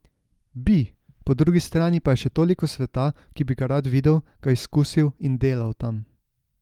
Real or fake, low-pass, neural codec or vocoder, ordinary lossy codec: real; 19.8 kHz; none; Opus, 32 kbps